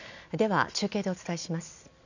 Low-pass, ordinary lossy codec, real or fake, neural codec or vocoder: 7.2 kHz; none; real; none